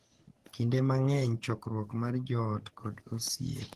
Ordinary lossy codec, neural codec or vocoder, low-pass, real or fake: Opus, 16 kbps; codec, 44.1 kHz, 7.8 kbps, DAC; 14.4 kHz; fake